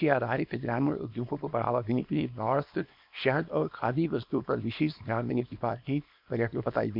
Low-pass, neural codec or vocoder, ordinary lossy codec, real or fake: 5.4 kHz; codec, 24 kHz, 0.9 kbps, WavTokenizer, small release; MP3, 48 kbps; fake